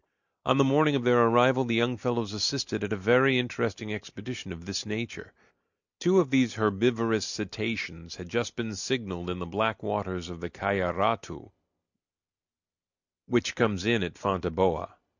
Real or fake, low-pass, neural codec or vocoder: real; 7.2 kHz; none